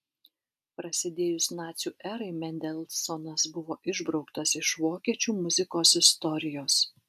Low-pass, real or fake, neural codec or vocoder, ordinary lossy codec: 14.4 kHz; real; none; AAC, 96 kbps